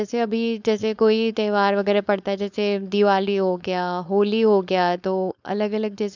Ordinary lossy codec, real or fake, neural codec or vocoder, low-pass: none; fake; codec, 16 kHz, 4.8 kbps, FACodec; 7.2 kHz